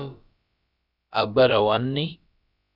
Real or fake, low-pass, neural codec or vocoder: fake; 5.4 kHz; codec, 16 kHz, about 1 kbps, DyCAST, with the encoder's durations